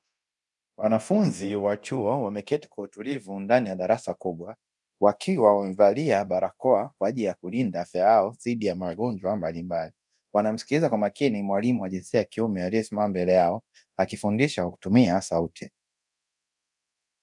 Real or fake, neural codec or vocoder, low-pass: fake; codec, 24 kHz, 0.9 kbps, DualCodec; 10.8 kHz